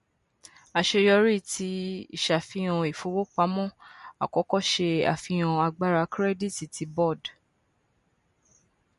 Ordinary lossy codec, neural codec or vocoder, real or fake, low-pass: MP3, 48 kbps; none; real; 14.4 kHz